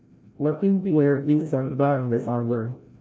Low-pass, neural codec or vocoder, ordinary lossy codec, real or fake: none; codec, 16 kHz, 0.5 kbps, FreqCodec, larger model; none; fake